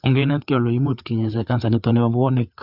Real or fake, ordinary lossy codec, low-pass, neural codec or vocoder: fake; Opus, 64 kbps; 5.4 kHz; codec, 16 kHz, 4 kbps, FreqCodec, larger model